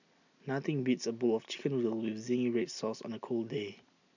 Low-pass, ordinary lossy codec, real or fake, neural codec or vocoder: 7.2 kHz; none; real; none